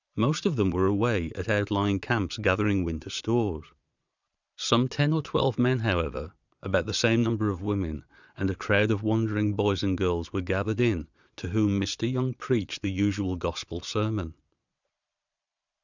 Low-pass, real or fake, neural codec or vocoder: 7.2 kHz; fake; vocoder, 22.05 kHz, 80 mel bands, Vocos